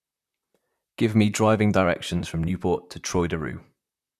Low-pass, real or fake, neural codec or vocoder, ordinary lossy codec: 14.4 kHz; fake; vocoder, 44.1 kHz, 128 mel bands, Pupu-Vocoder; none